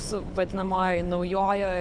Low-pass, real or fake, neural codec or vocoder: 9.9 kHz; fake; codec, 24 kHz, 6 kbps, HILCodec